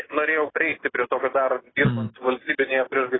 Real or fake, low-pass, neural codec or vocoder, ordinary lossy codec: real; 7.2 kHz; none; AAC, 16 kbps